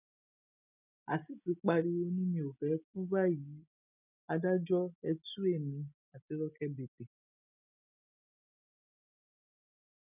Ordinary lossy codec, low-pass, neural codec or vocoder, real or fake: none; 3.6 kHz; none; real